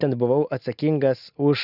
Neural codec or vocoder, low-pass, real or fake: none; 5.4 kHz; real